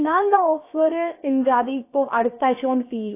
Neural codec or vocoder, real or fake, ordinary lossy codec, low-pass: codec, 16 kHz, about 1 kbps, DyCAST, with the encoder's durations; fake; AAC, 24 kbps; 3.6 kHz